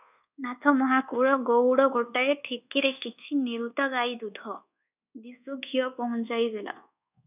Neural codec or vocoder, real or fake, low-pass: codec, 24 kHz, 1.2 kbps, DualCodec; fake; 3.6 kHz